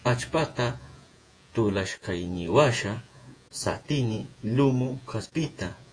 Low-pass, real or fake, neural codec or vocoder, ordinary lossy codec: 9.9 kHz; fake; vocoder, 48 kHz, 128 mel bands, Vocos; AAC, 48 kbps